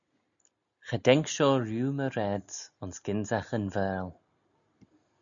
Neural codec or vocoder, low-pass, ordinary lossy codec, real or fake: none; 7.2 kHz; MP3, 96 kbps; real